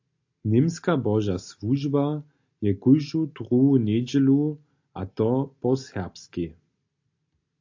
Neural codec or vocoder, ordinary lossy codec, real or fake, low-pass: none; AAC, 48 kbps; real; 7.2 kHz